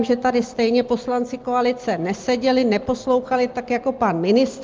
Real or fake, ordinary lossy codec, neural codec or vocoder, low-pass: real; Opus, 24 kbps; none; 7.2 kHz